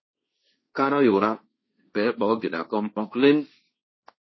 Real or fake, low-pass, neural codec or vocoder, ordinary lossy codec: fake; 7.2 kHz; codec, 16 kHz, 1.1 kbps, Voila-Tokenizer; MP3, 24 kbps